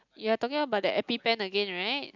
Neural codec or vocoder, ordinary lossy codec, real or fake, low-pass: none; none; real; 7.2 kHz